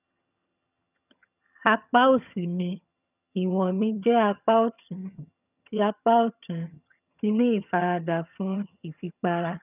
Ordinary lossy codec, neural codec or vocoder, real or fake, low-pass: none; vocoder, 22.05 kHz, 80 mel bands, HiFi-GAN; fake; 3.6 kHz